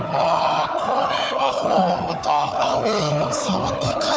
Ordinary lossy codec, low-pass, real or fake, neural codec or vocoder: none; none; fake; codec, 16 kHz, 4 kbps, FunCodec, trained on Chinese and English, 50 frames a second